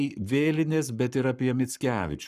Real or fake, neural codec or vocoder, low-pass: fake; codec, 44.1 kHz, 7.8 kbps, Pupu-Codec; 14.4 kHz